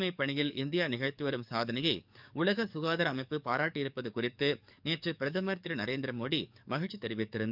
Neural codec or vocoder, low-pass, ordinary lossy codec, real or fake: codec, 44.1 kHz, 7.8 kbps, DAC; 5.4 kHz; none; fake